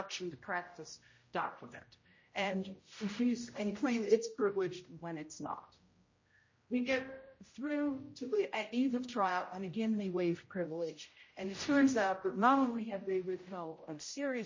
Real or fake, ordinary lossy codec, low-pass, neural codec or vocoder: fake; MP3, 32 kbps; 7.2 kHz; codec, 16 kHz, 0.5 kbps, X-Codec, HuBERT features, trained on general audio